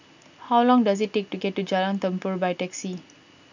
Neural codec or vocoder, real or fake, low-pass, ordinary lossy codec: none; real; 7.2 kHz; none